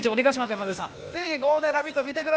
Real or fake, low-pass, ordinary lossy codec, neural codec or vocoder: fake; none; none; codec, 16 kHz, 0.8 kbps, ZipCodec